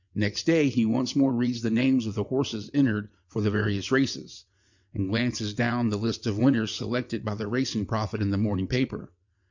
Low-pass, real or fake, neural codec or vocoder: 7.2 kHz; fake; vocoder, 22.05 kHz, 80 mel bands, WaveNeXt